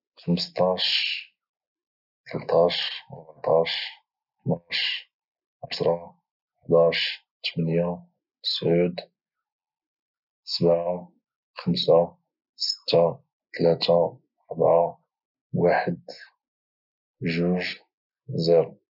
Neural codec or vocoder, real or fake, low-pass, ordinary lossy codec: none; real; 5.4 kHz; none